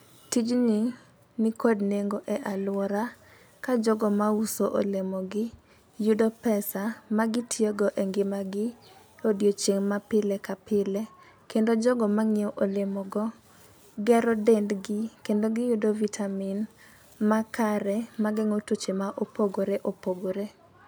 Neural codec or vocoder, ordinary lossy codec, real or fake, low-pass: none; none; real; none